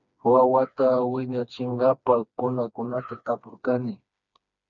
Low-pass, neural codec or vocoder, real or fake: 7.2 kHz; codec, 16 kHz, 2 kbps, FreqCodec, smaller model; fake